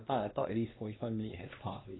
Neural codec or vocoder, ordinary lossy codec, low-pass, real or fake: codec, 16 kHz, 2 kbps, X-Codec, HuBERT features, trained on LibriSpeech; AAC, 16 kbps; 7.2 kHz; fake